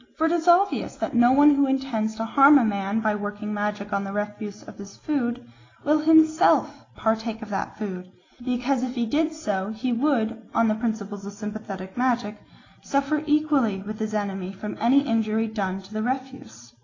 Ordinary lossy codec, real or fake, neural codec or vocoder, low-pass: AAC, 32 kbps; real; none; 7.2 kHz